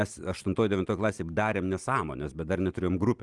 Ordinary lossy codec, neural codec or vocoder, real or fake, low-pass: Opus, 24 kbps; none; real; 10.8 kHz